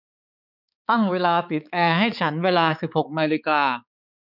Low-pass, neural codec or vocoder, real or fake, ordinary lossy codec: 5.4 kHz; codec, 16 kHz, 4 kbps, X-Codec, HuBERT features, trained on balanced general audio; fake; none